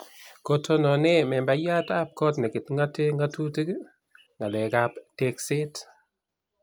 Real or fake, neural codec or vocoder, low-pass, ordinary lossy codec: real; none; none; none